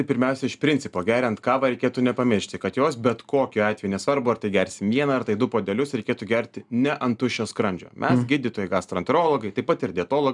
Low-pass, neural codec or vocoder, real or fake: 10.8 kHz; none; real